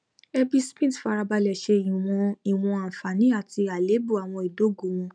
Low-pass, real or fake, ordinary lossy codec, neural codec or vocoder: 9.9 kHz; real; none; none